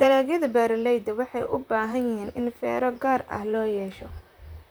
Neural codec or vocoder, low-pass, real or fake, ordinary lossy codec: vocoder, 44.1 kHz, 128 mel bands, Pupu-Vocoder; none; fake; none